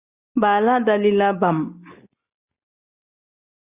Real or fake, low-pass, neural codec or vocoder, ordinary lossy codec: real; 3.6 kHz; none; Opus, 64 kbps